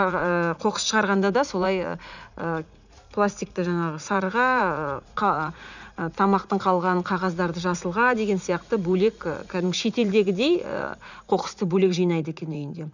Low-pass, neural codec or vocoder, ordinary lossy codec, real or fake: 7.2 kHz; vocoder, 44.1 kHz, 80 mel bands, Vocos; none; fake